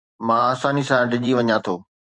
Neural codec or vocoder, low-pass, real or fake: vocoder, 44.1 kHz, 128 mel bands every 512 samples, BigVGAN v2; 10.8 kHz; fake